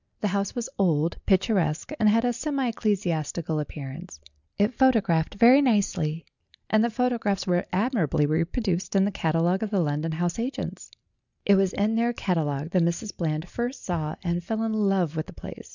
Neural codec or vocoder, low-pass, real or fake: none; 7.2 kHz; real